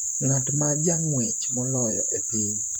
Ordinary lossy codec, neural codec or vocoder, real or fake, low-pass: none; vocoder, 44.1 kHz, 128 mel bands, Pupu-Vocoder; fake; none